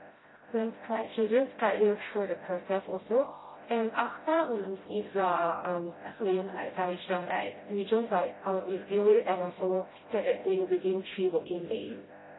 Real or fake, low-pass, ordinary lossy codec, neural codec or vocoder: fake; 7.2 kHz; AAC, 16 kbps; codec, 16 kHz, 0.5 kbps, FreqCodec, smaller model